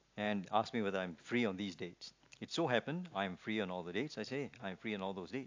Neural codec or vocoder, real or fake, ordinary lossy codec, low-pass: none; real; AAC, 48 kbps; 7.2 kHz